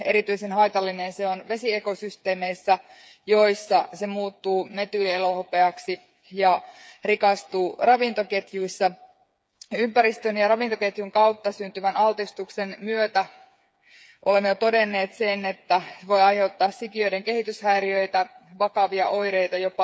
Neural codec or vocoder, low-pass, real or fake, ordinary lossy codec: codec, 16 kHz, 8 kbps, FreqCodec, smaller model; none; fake; none